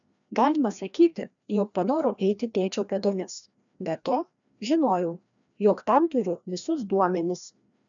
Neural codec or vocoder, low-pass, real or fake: codec, 16 kHz, 1 kbps, FreqCodec, larger model; 7.2 kHz; fake